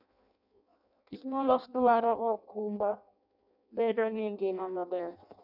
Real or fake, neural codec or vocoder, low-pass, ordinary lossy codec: fake; codec, 16 kHz in and 24 kHz out, 0.6 kbps, FireRedTTS-2 codec; 5.4 kHz; none